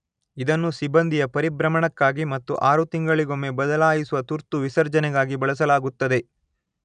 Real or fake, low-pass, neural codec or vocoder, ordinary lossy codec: real; 9.9 kHz; none; none